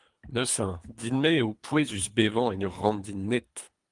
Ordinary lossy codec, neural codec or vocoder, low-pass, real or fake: Opus, 32 kbps; codec, 24 kHz, 3 kbps, HILCodec; 10.8 kHz; fake